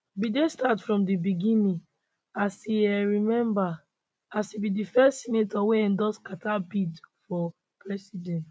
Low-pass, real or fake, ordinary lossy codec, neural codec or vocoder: none; real; none; none